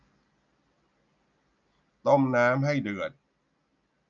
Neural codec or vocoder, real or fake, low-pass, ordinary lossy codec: none; real; 7.2 kHz; none